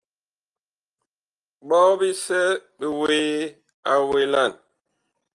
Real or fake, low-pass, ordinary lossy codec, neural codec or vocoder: real; 10.8 kHz; Opus, 32 kbps; none